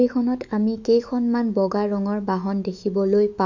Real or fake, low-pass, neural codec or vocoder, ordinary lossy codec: fake; 7.2 kHz; autoencoder, 48 kHz, 128 numbers a frame, DAC-VAE, trained on Japanese speech; none